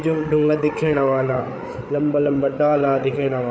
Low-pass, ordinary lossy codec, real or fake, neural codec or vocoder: none; none; fake; codec, 16 kHz, 8 kbps, FreqCodec, larger model